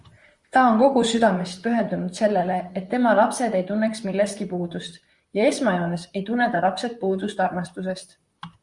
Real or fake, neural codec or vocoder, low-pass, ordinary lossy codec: fake; vocoder, 44.1 kHz, 128 mel bands, Pupu-Vocoder; 10.8 kHz; Opus, 64 kbps